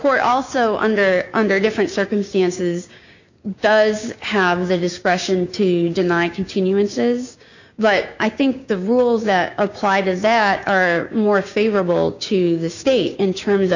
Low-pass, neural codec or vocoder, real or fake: 7.2 kHz; codec, 16 kHz, 6 kbps, DAC; fake